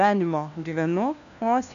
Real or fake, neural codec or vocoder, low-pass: fake; codec, 16 kHz, 1 kbps, FunCodec, trained on LibriTTS, 50 frames a second; 7.2 kHz